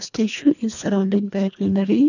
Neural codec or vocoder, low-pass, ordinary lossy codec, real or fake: codec, 24 kHz, 1.5 kbps, HILCodec; 7.2 kHz; none; fake